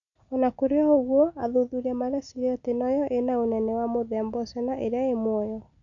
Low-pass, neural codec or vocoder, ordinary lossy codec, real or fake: 7.2 kHz; none; none; real